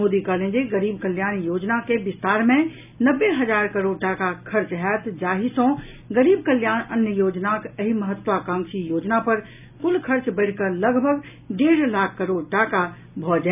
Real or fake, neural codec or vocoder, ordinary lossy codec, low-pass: real; none; none; 3.6 kHz